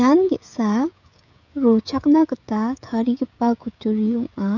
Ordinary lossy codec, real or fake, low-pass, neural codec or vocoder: none; fake; 7.2 kHz; codec, 16 kHz, 16 kbps, FreqCodec, smaller model